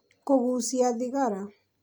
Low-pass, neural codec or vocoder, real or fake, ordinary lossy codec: none; none; real; none